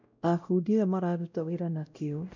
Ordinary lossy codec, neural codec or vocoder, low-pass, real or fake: none; codec, 16 kHz, 0.5 kbps, X-Codec, WavLM features, trained on Multilingual LibriSpeech; 7.2 kHz; fake